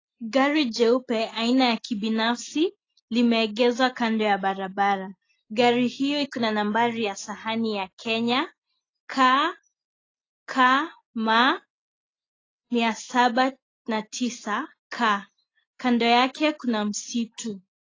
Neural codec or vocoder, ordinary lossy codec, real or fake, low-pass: none; AAC, 32 kbps; real; 7.2 kHz